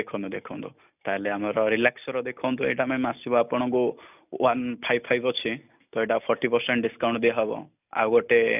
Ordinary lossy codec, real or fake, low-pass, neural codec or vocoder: none; real; 3.6 kHz; none